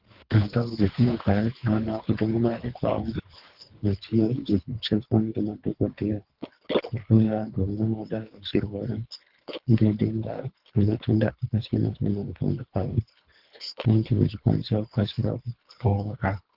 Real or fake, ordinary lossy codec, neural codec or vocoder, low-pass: fake; Opus, 32 kbps; codec, 24 kHz, 3 kbps, HILCodec; 5.4 kHz